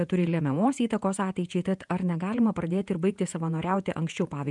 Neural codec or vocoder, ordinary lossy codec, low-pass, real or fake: vocoder, 48 kHz, 128 mel bands, Vocos; MP3, 96 kbps; 10.8 kHz; fake